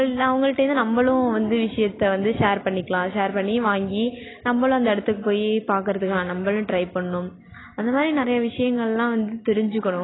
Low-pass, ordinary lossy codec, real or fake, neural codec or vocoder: 7.2 kHz; AAC, 16 kbps; real; none